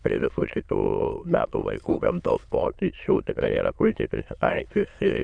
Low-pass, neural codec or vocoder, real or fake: 9.9 kHz; autoencoder, 22.05 kHz, a latent of 192 numbers a frame, VITS, trained on many speakers; fake